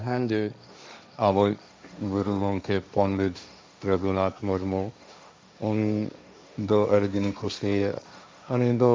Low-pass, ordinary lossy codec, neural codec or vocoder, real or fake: none; none; codec, 16 kHz, 1.1 kbps, Voila-Tokenizer; fake